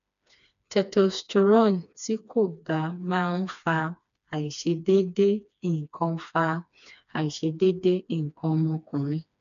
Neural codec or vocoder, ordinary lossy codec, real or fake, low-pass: codec, 16 kHz, 2 kbps, FreqCodec, smaller model; none; fake; 7.2 kHz